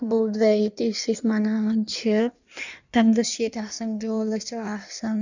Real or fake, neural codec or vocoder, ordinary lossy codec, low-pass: fake; codec, 16 kHz in and 24 kHz out, 1.1 kbps, FireRedTTS-2 codec; none; 7.2 kHz